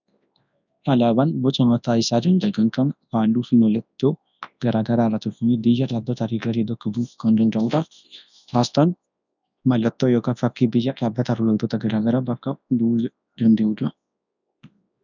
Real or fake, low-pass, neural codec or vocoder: fake; 7.2 kHz; codec, 24 kHz, 0.9 kbps, WavTokenizer, large speech release